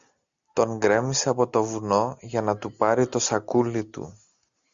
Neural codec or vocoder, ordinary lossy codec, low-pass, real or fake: none; Opus, 64 kbps; 7.2 kHz; real